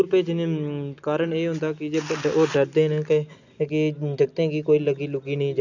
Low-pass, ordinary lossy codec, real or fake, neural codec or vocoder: 7.2 kHz; none; real; none